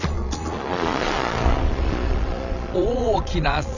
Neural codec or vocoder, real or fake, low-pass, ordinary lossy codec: vocoder, 22.05 kHz, 80 mel bands, Vocos; fake; 7.2 kHz; none